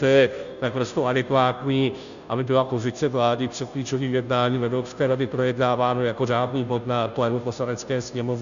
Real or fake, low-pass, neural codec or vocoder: fake; 7.2 kHz; codec, 16 kHz, 0.5 kbps, FunCodec, trained on Chinese and English, 25 frames a second